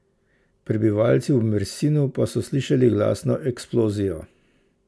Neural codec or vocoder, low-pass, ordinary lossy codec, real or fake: none; none; none; real